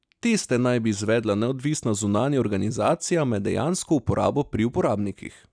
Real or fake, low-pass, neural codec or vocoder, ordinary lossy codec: real; 9.9 kHz; none; none